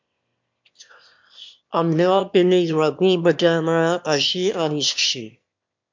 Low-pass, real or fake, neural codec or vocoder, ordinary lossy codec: 7.2 kHz; fake; autoencoder, 22.05 kHz, a latent of 192 numbers a frame, VITS, trained on one speaker; MP3, 64 kbps